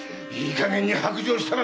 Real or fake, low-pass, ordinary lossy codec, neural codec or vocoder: real; none; none; none